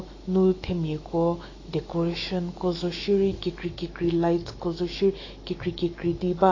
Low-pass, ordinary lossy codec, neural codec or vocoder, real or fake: 7.2 kHz; AAC, 32 kbps; autoencoder, 48 kHz, 128 numbers a frame, DAC-VAE, trained on Japanese speech; fake